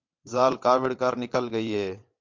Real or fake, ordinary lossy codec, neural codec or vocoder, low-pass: fake; MP3, 64 kbps; vocoder, 22.05 kHz, 80 mel bands, WaveNeXt; 7.2 kHz